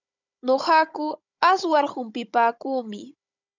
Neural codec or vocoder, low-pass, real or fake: codec, 16 kHz, 16 kbps, FunCodec, trained on Chinese and English, 50 frames a second; 7.2 kHz; fake